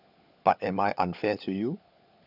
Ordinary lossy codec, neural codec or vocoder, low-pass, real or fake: none; codec, 16 kHz, 16 kbps, FunCodec, trained on LibriTTS, 50 frames a second; 5.4 kHz; fake